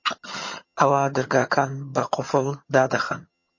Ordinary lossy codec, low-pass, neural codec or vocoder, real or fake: MP3, 32 kbps; 7.2 kHz; vocoder, 22.05 kHz, 80 mel bands, HiFi-GAN; fake